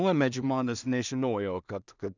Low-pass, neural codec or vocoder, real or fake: 7.2 kHz; codec, 16 kHz in and 24 kHz out, 0.4 kbps, LongCat-Audio-Codec, two codebook decoder; fake